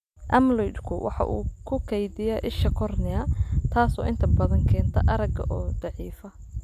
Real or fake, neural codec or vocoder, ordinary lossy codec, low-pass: real; none; none; 14.4 kHz